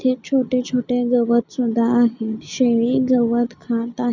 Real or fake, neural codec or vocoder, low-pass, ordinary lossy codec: real; none; 7.2 kHz; none